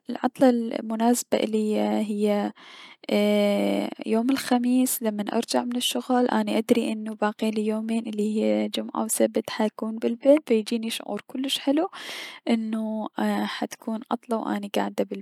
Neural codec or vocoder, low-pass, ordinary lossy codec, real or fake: none; 19.8 kHz; none; real